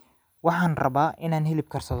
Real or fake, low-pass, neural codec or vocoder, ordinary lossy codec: real; none; none; none